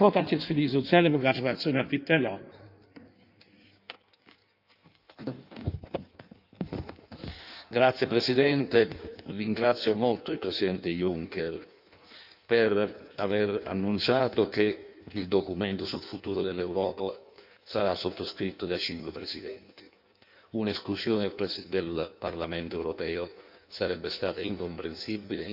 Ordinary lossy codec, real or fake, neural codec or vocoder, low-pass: none; fake; codec, 16 kHz in and 24 kHz out, 1.1 kbps, FireRedTTS-2 codec; 5.4 kHz